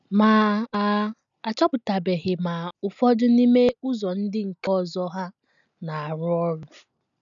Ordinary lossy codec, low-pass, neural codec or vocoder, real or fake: none; 7.2 kHz; none; real